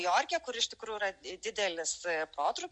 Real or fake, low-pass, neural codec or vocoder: real; 9.9 kHz; none